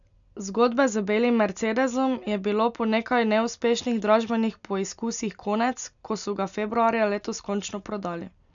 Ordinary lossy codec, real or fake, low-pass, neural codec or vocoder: none; real; 7.2 kHz; none